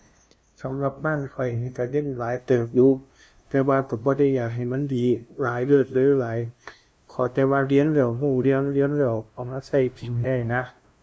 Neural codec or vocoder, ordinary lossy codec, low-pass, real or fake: codec, 16 kHz, 0.5 kbps, FunCodec, trained on LibriTTS, 25 frames a second; none; none; fake